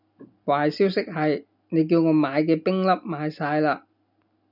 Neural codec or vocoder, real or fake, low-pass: none; real; 5.4 kHz